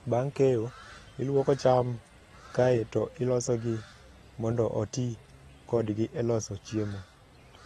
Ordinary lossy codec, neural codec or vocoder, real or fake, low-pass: AAC, 32 kbps; none; real; 19.8 kHz